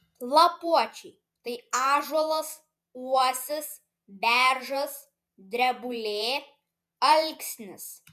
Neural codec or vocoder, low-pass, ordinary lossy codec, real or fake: vocoder, 48 kHz, 128 mel bands, Vocos; 14.4 kHz; MP3, 96 kbps; fake